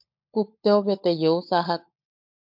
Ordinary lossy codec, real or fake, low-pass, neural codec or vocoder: MP3, 48 kbps; fake; 5.4 kHz; codec, 16 kHz, 16 kbps, FunCodec, trained on LibriTTS, 50 frames a second